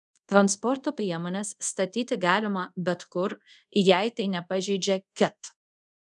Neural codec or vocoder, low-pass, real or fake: codec, 24 kHz, 0.5 kbps, DualCodec; 10.8 kHz; fake